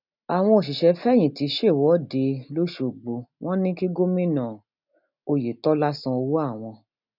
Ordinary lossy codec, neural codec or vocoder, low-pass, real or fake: none; none; 5.4 kHz; real